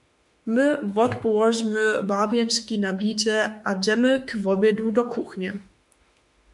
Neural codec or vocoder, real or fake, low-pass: autoencoder, 48 kHz, 32 numbers a frame, DAC-VAE, trained on Japanese speech; fake; 10.8 kHz